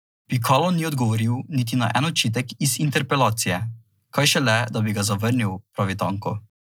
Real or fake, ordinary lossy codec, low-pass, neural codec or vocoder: real; none; none; none